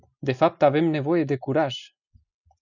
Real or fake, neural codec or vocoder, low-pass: real; none; 7.2 kHz